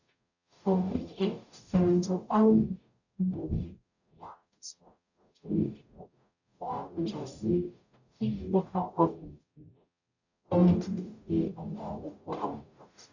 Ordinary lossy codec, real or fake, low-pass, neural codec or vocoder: none; fake; 7.2 kHz; codec, 44.1 kHz, 0.9 kbps, DAC